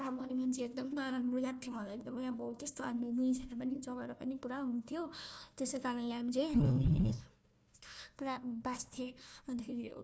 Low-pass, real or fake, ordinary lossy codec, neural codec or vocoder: none; fake; none; codec, 16 kHz, 1 kbps, FunCodec, trained on Chinese and English, 50 frames a second